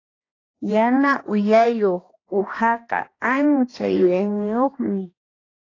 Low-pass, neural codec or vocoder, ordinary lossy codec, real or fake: 7.2 kHz; codec, 16 kHz, 1 kbps, X-Codec, HuBERT features, trained on balanced general audio; AAC, 32 kbps; fake